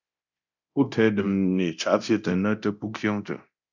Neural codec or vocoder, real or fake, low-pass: codec, 24 kHz, 0.9 kbps, DualCodec; fake; 7.2 kHz